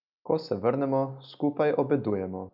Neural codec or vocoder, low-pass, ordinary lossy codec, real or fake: none; 5.4 kHz; none; real